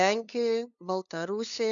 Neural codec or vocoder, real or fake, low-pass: codec, 16 kHz, 2 kbps, FunCodec, trained on LibriTTS, 25 frames a second; fake; 7.2 kHz